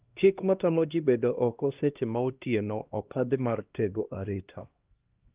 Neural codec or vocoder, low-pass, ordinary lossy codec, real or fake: codec, 16 kHz, 1 kbps, X-Codec, HuBERT features, trained on LibriSpeech; 3.6 kHz; Opus, 32 kbps; fake